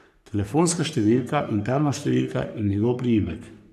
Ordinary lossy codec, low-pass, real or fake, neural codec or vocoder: none; 14.4 kHz; fake; codec, 44.1 kHz, 3.4 kbps, Pupu-Codec